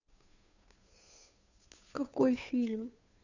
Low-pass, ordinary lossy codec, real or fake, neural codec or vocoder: 7.2 kHz; none; fake; codec, 16 kHz, 2 kbps, FunCodec, trained on Chinese and English, 25 frames a second